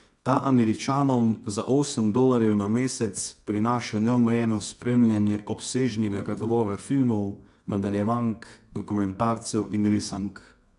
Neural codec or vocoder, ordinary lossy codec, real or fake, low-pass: codec, 24 kHz, 0.9 kbps, WavTokenizer, medium music audio release; none; fake; 10.8 kHz